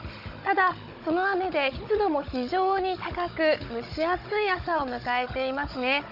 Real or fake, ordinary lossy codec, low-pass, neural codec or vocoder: fake; none; 5.4 kHz; codec, 16 kHz, 16 kbps, FunCodec, trained on Chinese and English, 50 frames a second